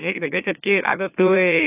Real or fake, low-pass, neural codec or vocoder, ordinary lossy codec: fake; 3.6 kHz; autoencoder, 44.1 kHz, a latent of 192 numbers a frame, MeloTTS; AAC, 32 kbps